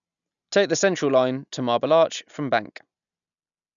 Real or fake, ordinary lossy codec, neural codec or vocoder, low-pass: real; none; none; 7.2 kHz